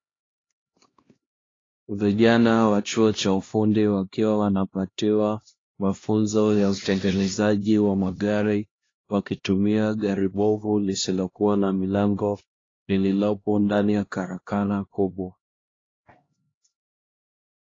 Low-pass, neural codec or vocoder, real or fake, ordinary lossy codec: 7.2 kHz; codec, 16 kHz, 1 kbps, X-Codec, HuBERT features, trained on LibriSpeech; fake; AAC, 32 kbps